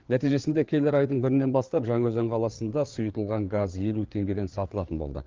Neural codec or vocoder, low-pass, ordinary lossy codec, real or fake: codec, 16 kHz, 4 kbps, FreqCodec, larger model; 7.2 kHz; Opus, 32 kbps; fake